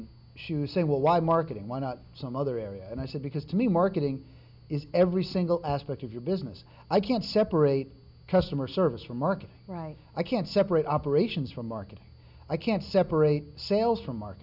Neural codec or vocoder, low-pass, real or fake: none; 5.4 kHz; real